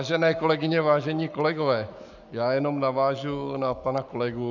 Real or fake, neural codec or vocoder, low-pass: fake; codec, 44.1 kHz, 7.8 kbps, Pupu-Codec; 7.2 kHz